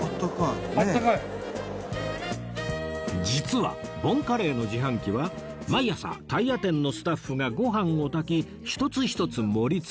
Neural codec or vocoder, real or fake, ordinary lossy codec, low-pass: none; real; none; none